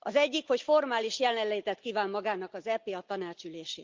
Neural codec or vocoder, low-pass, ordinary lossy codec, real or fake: none; 7.2 kHz; Opus, 24 kbps; real